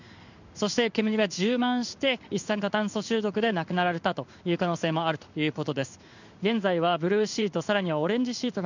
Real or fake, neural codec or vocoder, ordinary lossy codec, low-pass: fake; codec, 16 kHz in and 24 kHz out, 1 kbps, XY-Tokenizer; none; 7.2 kHz